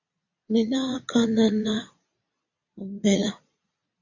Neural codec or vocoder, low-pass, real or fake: vocoder, 22.05 kHz, 80 mel bands, Vocos; 7.2 kHz; fake